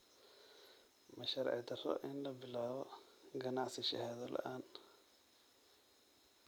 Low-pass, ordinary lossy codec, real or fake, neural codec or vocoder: none; none; fake; vocoder, 44.1 kHz, 128 mel bands every 256 samples, BigVGAN v2